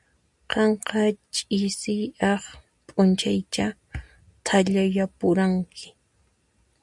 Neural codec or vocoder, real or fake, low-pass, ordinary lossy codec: none; real; 10.8 kHz; MP3, 96 kbps